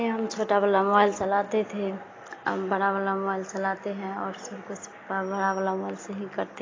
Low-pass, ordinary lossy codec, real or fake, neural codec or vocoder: 7.2 kHz; AAC, 32 kbps; real; none